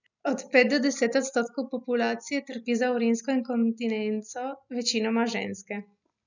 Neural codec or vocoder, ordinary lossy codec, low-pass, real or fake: none; none; 7.2 kHz; real